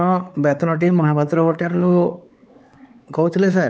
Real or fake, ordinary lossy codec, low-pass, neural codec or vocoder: fake; none; none; codec, 16 kHz, 4 kbps, X-Codec, WavLM features, trained on Multilingual LibriSpeech